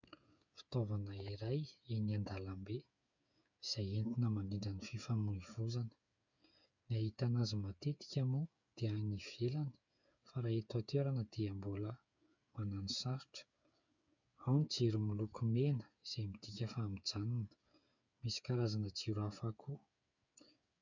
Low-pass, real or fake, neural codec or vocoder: 7.2 kHz; fake; codec, 16 kHz, 8 kbps, FreqCodec, smaller model